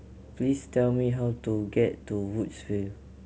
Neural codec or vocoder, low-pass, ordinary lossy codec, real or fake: none; none; none; real